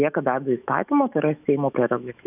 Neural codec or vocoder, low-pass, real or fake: autoencoder, 48 kHz, 128 numbers a frame, DAC-VAE, trained on Japanese speech; 3.6 kHz; fake